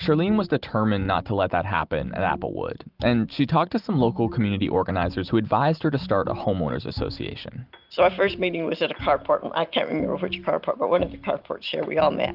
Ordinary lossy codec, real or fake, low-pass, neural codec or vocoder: Opus, 32 kbps; real; 5.4 kHz; none